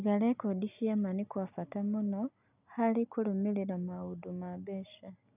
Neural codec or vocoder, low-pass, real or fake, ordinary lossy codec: none; 3.6 kHz; real; none